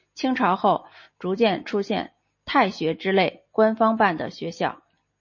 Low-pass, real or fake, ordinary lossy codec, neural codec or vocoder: 7.2 kHz; real; MP3, 32 kbps; none